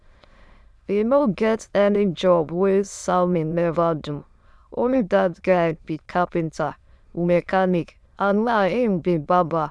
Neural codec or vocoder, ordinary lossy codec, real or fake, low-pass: autoencoder, 22.05 kHz, a latent of 192 numbers a frame, VITS, trained on many speakers; none; fake; none